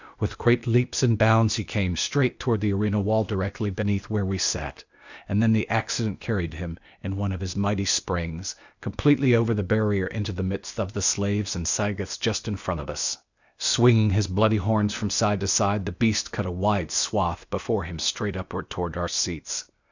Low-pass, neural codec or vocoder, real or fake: 7.2 kHz; codec, 16 kHz, 0.8 kbps, ZipCodec; fake